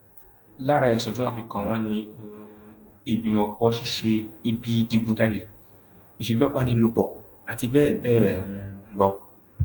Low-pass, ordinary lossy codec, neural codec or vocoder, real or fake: 19.8 kHz; none; codec, 44.1 kHz, 2.6 kbps, DAC; fake